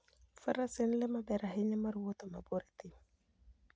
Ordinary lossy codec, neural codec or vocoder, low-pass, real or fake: none; none; none; real